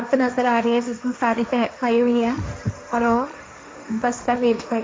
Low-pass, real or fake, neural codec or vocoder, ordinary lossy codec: none; fake; codec, 16 kHz, 1.1 kbps, Voila-Tokenizer; none